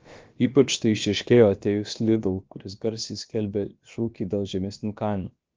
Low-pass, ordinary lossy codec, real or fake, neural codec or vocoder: 7.2 kHz; Opus, 24 kbps; fake; codec, 16 kHz, about 1 kbps, DyCAST, with the encoder's durations